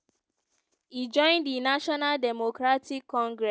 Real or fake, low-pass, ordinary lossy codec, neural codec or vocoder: real; none; none; none